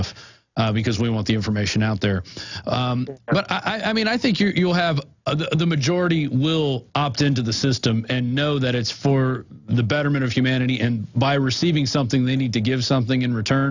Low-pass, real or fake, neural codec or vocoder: 7.2 kHz; real; none